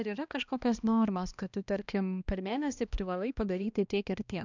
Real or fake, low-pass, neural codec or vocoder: fake; 7.2 kHz; codec, 16 kHz, 1 kbps, X-Codec, HuBERT features, trained on balanced general audio